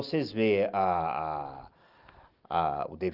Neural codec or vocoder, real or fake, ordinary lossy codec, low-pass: none; real; Opus, 32 kbps; 5.4 kHz